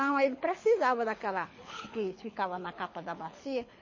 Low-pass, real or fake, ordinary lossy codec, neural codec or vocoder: 7.2 kHz; fake; MP3, 32 kbps; codec, 24 kHz, 6 kbps, HILCodec